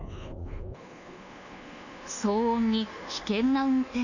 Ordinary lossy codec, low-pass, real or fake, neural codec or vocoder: none; 7.2 kHz; fake; codec, 24 kHz, 1.2 kbps, DualCodec